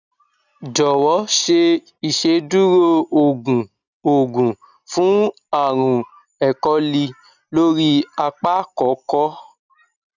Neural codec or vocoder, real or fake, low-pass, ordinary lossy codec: none; real; 7.2 kHz; none